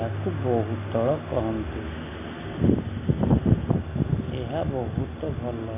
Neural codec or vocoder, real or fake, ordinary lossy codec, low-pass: none; real; AAC, 16 kbps; 3.6 kHz